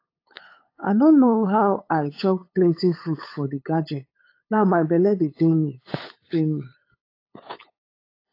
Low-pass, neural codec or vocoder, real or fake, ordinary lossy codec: 5.4 kHz; codec, 16 kHz, 8 kbps, FunCodec, trained on LibriTTS, 25 frames a second; fake; AAC, 32 kbps